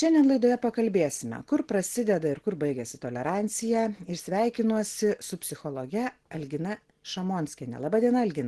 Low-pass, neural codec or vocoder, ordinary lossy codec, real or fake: 10.8 kHz; none; Opus, 16 kbps; real